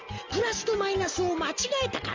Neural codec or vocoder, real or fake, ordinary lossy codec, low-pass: none; real; Opus, 32 kbps; 7.2 kHz